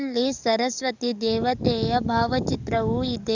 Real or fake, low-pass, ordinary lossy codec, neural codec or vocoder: fake; 7.2 kHz; none; codec, 44.1 kHz, 7.8 kbps, DAC